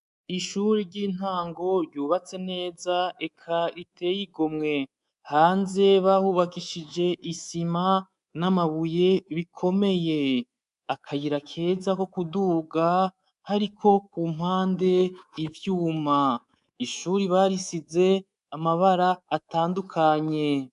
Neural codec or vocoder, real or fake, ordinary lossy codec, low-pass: codec, 24 kHz, 3.1 kbps, DualCodec; fake; MP3, 96 kbps; 10.8 kHz